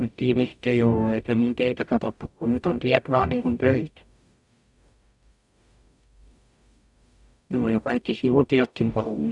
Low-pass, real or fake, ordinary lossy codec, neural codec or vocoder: 10.8 kHz; fake; none; codec, 44.1 kHz, 0.9 kbps, DAC